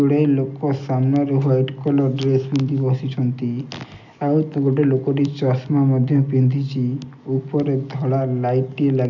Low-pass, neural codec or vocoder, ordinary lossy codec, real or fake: 7.2 kHz; none; none; real